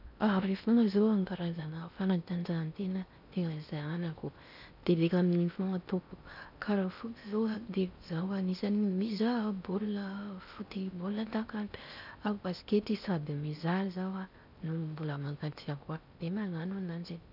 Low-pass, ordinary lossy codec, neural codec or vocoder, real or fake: 5.4 kHz; none; codec, 16 kHz in and 24 kHz out, 0.6 kbps, FocalCodec, streaming, 4096 codes; fake